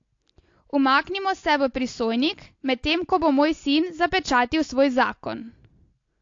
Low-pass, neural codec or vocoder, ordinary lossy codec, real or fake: 7.2 kHz; none; AAC, 48 kbps; real